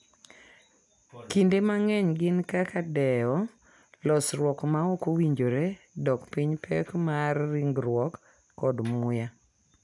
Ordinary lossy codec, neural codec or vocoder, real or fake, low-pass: none; none; real; 10.8 kHz